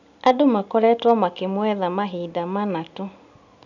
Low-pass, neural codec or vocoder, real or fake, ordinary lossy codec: 7.2 kHz; none; real; none